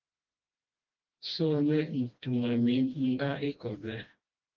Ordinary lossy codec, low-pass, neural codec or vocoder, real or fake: Opus, 32 kbps; 7.2 kHz; codec, 16 kHz, 1 kbps, FreqCodec, smaller model; fake